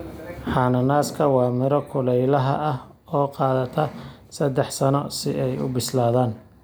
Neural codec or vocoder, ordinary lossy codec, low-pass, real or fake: none; none; none; real